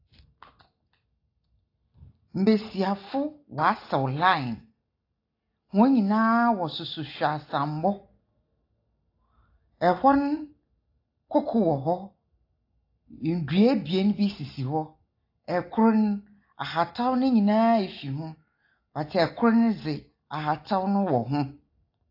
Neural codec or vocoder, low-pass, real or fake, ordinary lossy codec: none; 5.4 kHz; real; AAC, 32 kbps